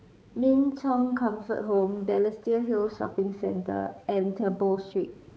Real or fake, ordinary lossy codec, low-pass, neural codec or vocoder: fake; none; none; codec, 16 kHz, 4 kbps, X-Codec, HuBERT features, trained on balanced general audio